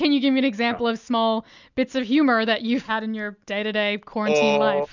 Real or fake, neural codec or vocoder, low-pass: real; none; 7.2 kHz